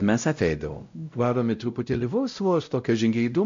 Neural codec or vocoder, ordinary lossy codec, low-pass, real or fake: codec, 16 kHz, 0.5 kbps, X-Codec, WavLM features, trained on Multilingual LibriSpeech; AAC, 64 kbps; 7.2 kHz; fake